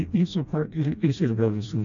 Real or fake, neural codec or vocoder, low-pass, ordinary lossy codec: fake; codec, 16 kHz, 1 kbps, FreqCodec, smaller model; 7.2 kHz; AAC, 64 kbps